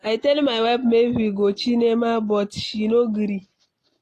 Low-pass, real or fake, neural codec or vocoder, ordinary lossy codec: 14.4 kHz; real; none; AAC, 48 kbps